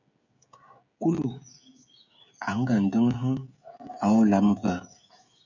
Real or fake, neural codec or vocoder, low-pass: fake; codec, 16 kHz, 16 kbps, FreqCodec, smaller model; 7.2 kHz